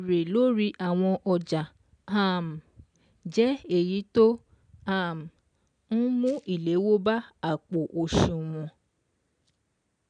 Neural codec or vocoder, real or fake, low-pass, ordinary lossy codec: none; real; 9.9 kHz; none